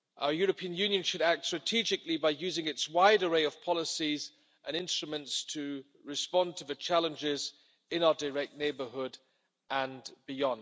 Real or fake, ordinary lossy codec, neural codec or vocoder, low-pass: real; none; none; none